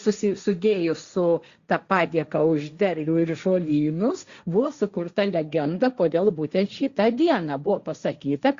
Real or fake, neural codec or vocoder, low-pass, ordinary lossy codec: fake; codec, 16 kHz, 1.1 kbps, Voila-Tokenizer; 7.2 kHz; Opus, 64 kbps